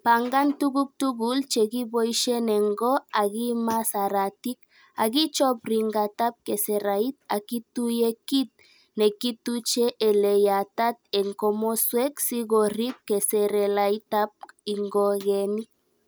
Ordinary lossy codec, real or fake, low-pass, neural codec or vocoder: none; real; none; none